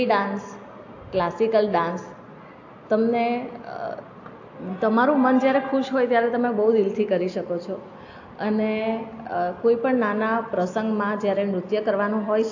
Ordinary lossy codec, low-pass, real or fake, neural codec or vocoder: AAC, 48 kbps; 7.2 kHz; real; none